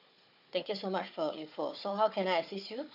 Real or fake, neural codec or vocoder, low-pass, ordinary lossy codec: fake; codec, 16 kHz, 4 kbps, FunCodec, trained on Chinese and English, 50 frames a second; 5.4 kHz; AAC, 48 kbps